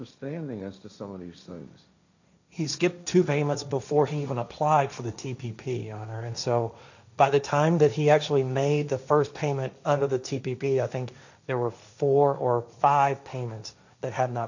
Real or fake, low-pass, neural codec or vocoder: fake; 7.2 kHz; codec, 16 kHz, 1.1 kbps, Voila-Tokenizer